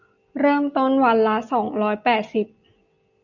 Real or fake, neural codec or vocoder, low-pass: real; none; 7.2 kHz